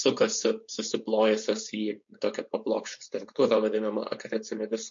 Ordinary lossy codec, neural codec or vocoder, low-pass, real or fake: MP3, 48 kbps; codec, 16 kHz, 4.8 kbps, FACodec; 7.2 kHz; fake